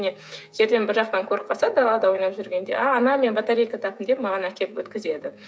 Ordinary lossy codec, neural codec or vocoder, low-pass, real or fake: none; codec, 16 kHz, 16 kbps, FreqCodec, smaller model; none; fake